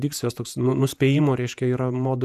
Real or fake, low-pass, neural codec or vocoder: fake; 14.4 kHz; vocoder, 48 kHz, 128 mel bands, Vocos